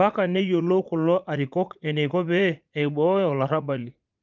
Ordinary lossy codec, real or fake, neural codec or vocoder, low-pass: Opus, 32 kbps; fake; vocoder, 44.1 kHz, 80 mel bands, Vocos; 7.2 kHz